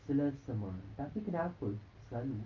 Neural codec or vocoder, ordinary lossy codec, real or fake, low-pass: none; Opus, 24 kbps; real; 7.2 kHz